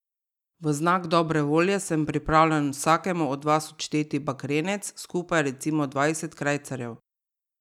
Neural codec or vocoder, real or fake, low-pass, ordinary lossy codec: none; real; 19.8 kHz; none